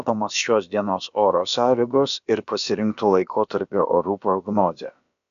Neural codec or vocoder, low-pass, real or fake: codec, 16 kHz, about 1 kbps, DyCAST, with the encoder's durations; 7.2 kHz; fake